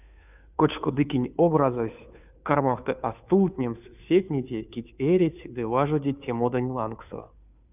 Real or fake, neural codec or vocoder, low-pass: fake; codec, 16 kHz, 2 kbps, FunCodec, trained on Chinese and English, 25 frames a second; 3.6 kHz